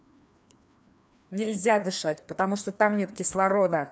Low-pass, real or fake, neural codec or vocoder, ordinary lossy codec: none; fake; codec, 16 kHz, 2 kbps, FreqCodec, larger model; none